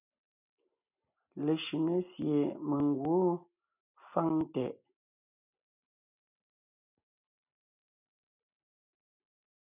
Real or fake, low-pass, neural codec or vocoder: real; 3.6 kHz; none